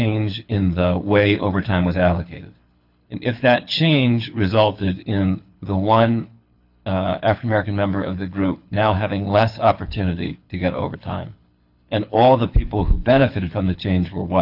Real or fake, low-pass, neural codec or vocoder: fake; 5.4 kHz; codec, 24 kHz, 6 kbps, HILCodec